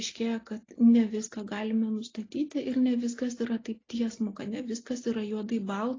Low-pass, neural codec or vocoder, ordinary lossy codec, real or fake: 7.2 kHz; none; AAC, 32 kbps; real